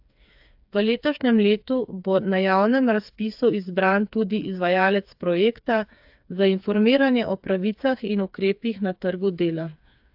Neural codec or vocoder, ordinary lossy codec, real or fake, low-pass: codec, 16 kHz, 4 kbps, FreqCodec, smaller model; AAC, 48 kbps; fake; 5.4 kHz